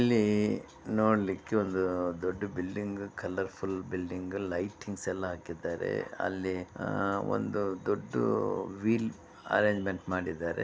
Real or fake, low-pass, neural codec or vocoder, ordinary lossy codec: real; none; none; none